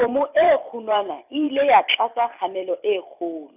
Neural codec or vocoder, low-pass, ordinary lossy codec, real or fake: vocoder, 44.1 kHz, 128 mel bands every 256 samples, BigVGAN v2; 3.6 kHz; none; fake